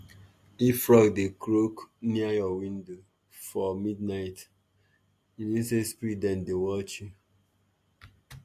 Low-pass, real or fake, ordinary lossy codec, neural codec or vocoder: 14.4 kHz; real; MP3, 64 kbps; none